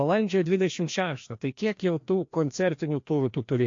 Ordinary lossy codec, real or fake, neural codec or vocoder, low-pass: AAC, 48 kbps; fake; codec, 16 kHz, 1 kbps, FreqCodec, larger model; 7.2 kHz